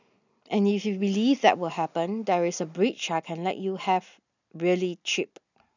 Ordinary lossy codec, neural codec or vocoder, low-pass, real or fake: none; none; 7.2 kHz; real